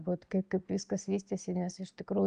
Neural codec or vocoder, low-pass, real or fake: none; 9.9 kHz; real